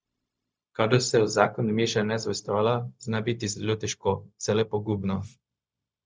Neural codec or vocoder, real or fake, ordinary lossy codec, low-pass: codec, 16 kHz, 0.4 kbps, LongCat-Audio-Codec; fake; none; none